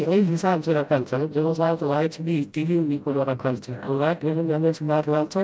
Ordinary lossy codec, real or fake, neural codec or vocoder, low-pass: none; fake; codec, 16 kHz, 0.5 kbps, FreqCodec, smaller model; none